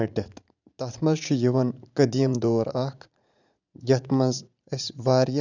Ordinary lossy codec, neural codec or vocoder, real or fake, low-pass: none; vocoder, 44.1 kHz, 80 mel bands, Vocos; fake; 7.2 kHz